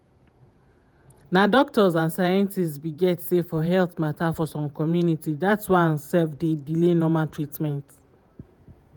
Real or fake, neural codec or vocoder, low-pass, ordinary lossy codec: fake; vocoder, 48 kHz, 128 mel bands, Vocos; none; none